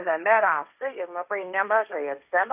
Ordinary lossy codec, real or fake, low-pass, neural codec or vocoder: AAC, 32 kbps; fake; 3.6 kHz; codec, 16 kHz, 1.1 kbps, Voila-Tokenizer